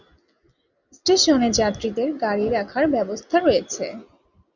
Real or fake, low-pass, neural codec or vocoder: real; 7.2 kHz; none